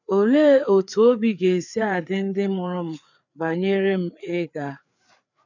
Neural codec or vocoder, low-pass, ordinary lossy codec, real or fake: codec, 16 kHz, 4 kbps, FreqCodec, larger model; 7.2 kHz; none; fake